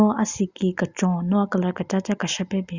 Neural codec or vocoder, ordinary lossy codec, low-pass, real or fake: none; Opus, 64 kbps; 7.2 kHz; real